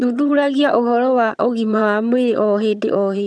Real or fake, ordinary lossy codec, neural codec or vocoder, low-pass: fake; none; vocoder, 22.05 kHz, 80 mel bands, HiFi-GAN; none